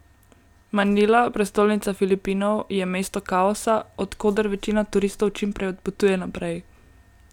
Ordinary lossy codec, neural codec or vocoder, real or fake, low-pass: none; none; real; 19.8 kHz